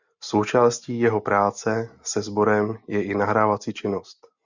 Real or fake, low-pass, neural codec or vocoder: real; 7.2 kHz; none